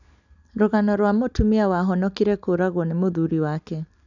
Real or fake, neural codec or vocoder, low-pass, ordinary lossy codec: real; none; 7.2 kHz; none